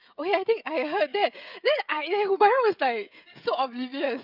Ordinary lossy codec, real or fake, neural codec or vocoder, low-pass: none; fake; codec, 16 kHz, 16 kbps, FreqCodec, smaller model; 5.4 kHz